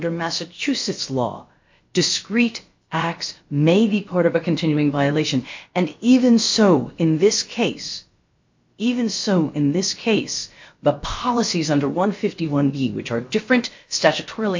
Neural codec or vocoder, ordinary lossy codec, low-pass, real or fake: codec, 16 kHz, about 1 kbps, DyCAST, with the encoder's durations; MP3, 48 kbps; 7.2 kHz; fake